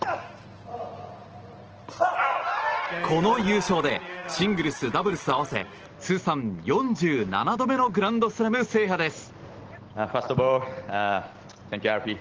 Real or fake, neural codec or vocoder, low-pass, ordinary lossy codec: real; none; 7.2 kHz; Opus, 16 kbps